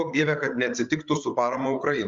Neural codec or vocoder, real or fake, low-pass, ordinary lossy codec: codec, 16 kHz, 8 kbps, FreqCodec, larger model; fake; 7.2 kHz; Opus, 32 kbps